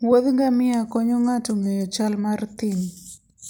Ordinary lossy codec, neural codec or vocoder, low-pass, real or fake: none; none; none; real